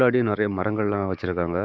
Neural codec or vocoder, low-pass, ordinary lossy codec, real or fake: codec, 16 kHz, 6 kbps, DAC; none; none; fake